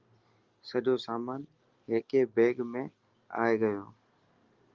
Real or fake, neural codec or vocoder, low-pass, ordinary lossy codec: real; none; 7.2 kHz; Opus, 32 kbps